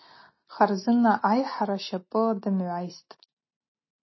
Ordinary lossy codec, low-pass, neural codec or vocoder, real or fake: MP3, 24 kbps; 7.2 kHz; none; real